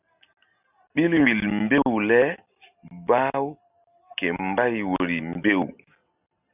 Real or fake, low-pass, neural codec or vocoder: real; 3.6 kHz; none